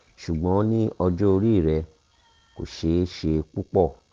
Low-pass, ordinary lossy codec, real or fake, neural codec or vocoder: 7.2 kHz; Opus, 16 kbps; real; none